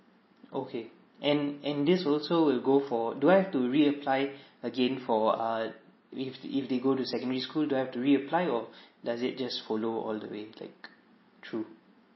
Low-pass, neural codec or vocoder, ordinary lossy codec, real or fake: 7.2 kHz; none; MP3, 24 kbps; real